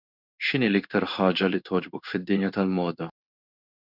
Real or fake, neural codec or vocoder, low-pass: fake; codec, 16 kHz in and 24 kHz out, 1 kbps, XY-Tokenizer; 5.4 kHz